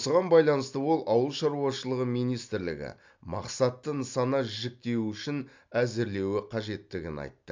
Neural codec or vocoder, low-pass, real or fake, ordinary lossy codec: none; 7.2 kHz; real; MP3, 64 kbps